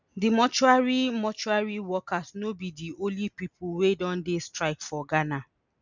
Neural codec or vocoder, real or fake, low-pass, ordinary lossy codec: none; real; 7.2 kHz; none